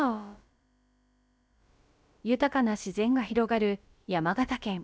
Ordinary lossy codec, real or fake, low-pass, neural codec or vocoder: none; fake; none; codec, 16 kHz, about 1 kbps, DyCAST, with the encoder's durations